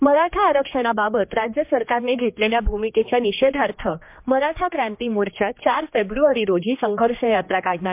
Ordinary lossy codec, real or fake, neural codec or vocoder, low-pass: MP3, 32 kbps; fake; codec, 16 kHz, 2 kbps, X-Codec, HuBERT features, trained on general audio; 3.6 kHz